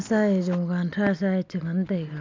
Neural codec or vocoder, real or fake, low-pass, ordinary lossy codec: none; real; 7.2 kHz; MP3, 64 kbps